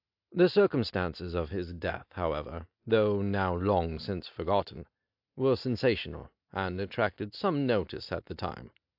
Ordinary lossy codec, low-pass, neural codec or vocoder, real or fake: AAC, 48 kbps; 5.4 kHz; none; real